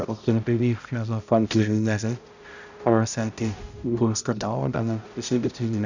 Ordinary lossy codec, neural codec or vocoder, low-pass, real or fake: none; codec, 16 kHz, 0.5 kbps, X-Codec, HuBERT features, trained on balanced general audio; 7.2 kHz; fake